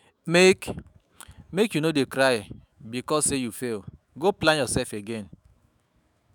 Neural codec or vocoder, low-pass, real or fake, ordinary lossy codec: autoencoder, 48 kHz, 128 numbers a frame, DAC-VAE, trained on Japanese speech; none; fake; none